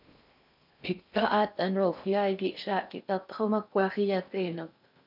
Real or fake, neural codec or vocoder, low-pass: fake; codec, 16 kHz in and 24 kHz out, 0.6 kbps, FocalCodec, streaming, 2048 codes; 5.4 kHz